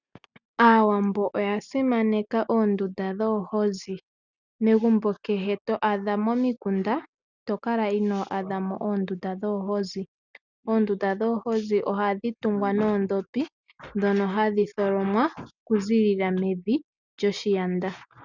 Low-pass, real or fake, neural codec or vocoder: 7.2 kHz; real; none